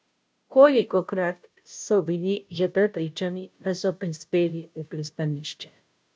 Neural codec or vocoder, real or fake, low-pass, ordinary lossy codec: codec, 16 kHz, 0.5 kbps, FunCodec, trained on Chinese and English, 25 frames a second; fake; none; none